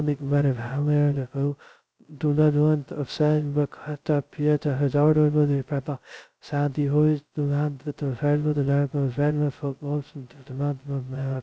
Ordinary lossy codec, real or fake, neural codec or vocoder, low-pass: none; fake; codec, 16 kHz, 0.2 kbps, FocalCodec; none